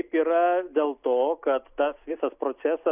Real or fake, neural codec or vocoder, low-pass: real; none; 3.6 kHz